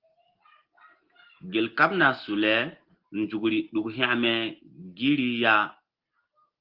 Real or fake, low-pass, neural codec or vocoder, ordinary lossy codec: real; 5.4 kHz; none; Opus, 16 kbps